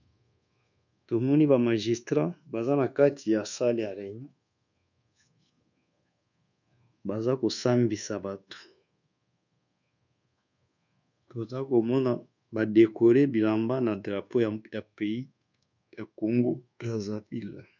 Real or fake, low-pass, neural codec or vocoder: fake; 7.2 kHz; codec, 24 kHz, 1.2 kbps, DualCodec